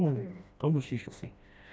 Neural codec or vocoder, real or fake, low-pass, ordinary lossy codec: codec, 16 kHz, 1 kbps, FreqCodec, smaller model; fake; none; none